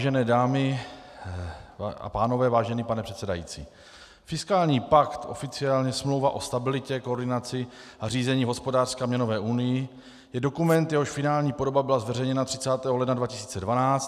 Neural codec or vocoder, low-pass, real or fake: none; 14.4 kHz; real